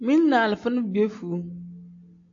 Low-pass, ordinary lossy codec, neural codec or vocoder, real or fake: 7.2 kHz; AAC, 48 kbps; none; real